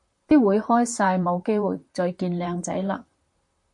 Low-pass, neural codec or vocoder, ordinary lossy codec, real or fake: 10.8 kHz; vocoder, 44.1 kHz, 128 mel bands, Pupu-Vocoder; MP3, 48 kbps; fake